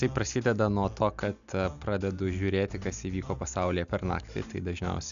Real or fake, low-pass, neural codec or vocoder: real; 7.2 kHz; none